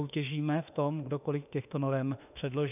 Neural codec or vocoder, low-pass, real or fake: autoencoder, 48 kHz, 32 numbers a frame, DAC-VAE, trained on Japanese speech; 3.6 kHz; fake